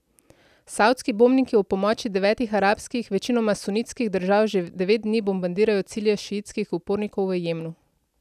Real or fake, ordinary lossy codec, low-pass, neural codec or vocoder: real; none; 14.4 kHz; none